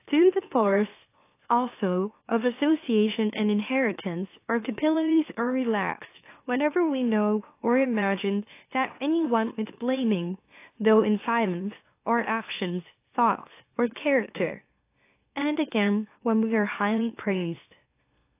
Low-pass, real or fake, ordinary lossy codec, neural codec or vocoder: 3.6 kHz; fake; AAC, 24 kbps; autoencoder, 44.1 kHz, a latent of 192 numbers a frame, MeloTTS